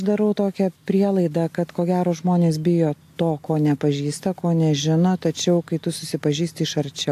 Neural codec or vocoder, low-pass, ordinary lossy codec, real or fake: none; 14.4 kHz; AAC, 64 kbps; real